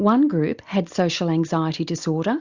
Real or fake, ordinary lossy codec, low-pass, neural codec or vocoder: real; Opus, 64 kbps; 7.2 kHz; none